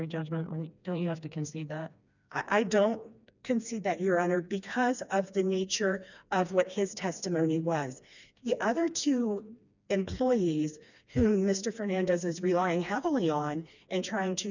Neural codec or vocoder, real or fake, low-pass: codec, 16 kHz, 2 kbps, FreqCodec, smaller model; fake; 7.2 kHz